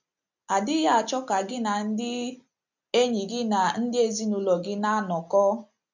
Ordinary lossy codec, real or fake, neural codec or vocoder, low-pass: none; real; none; 7.2 kHz